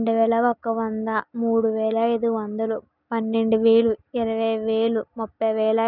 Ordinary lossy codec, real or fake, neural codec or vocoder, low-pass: none; real; none; 5.4 kHz